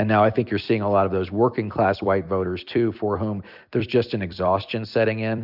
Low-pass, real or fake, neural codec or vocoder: 5.4 kHz; fake; vocoder, 44.1 kHz, 128 mel bands every 256 samples, BigVGAN v2